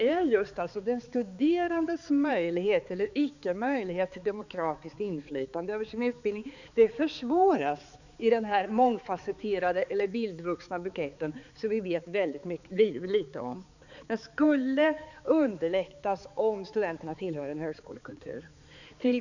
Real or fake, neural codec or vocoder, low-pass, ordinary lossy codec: fake; codec, 16 kHz, 4 kbps, X-Codec, HuBERT features, trained on balanced general audio; 7.2 kHz; none